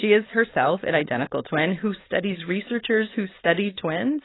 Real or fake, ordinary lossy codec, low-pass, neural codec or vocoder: fake; AAC, 16 kbps; 7.2 kHz; codec, 16 kHz, 4.8 kbps, FACodec